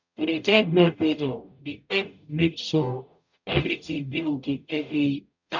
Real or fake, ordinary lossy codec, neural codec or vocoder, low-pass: fake; none; codec, 44.1 kHz, 0.9 kbps, DAC; 7.2 kHz